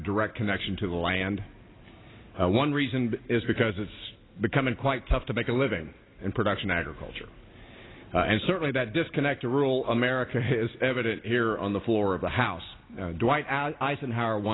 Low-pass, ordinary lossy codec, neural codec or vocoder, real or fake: 7.2 kHz; AAC, 16 kbps; none; real